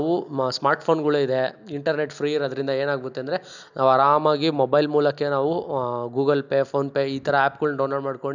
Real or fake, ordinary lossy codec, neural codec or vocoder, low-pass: real; none; none; 7.2 kHz